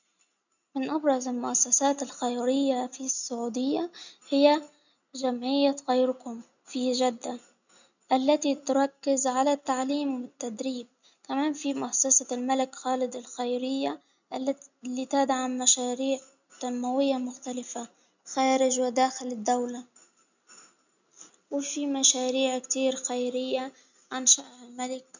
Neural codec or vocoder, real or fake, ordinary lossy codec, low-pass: none; real; none; 7.2 kHz